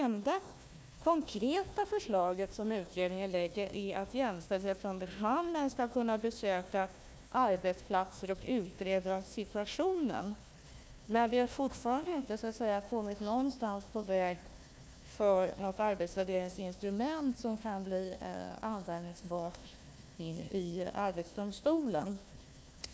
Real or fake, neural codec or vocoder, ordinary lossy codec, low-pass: fake; codec, 16 kHz, 1 kbps, FunCodec, trained on Chinese and English, 50 frames a second; none; none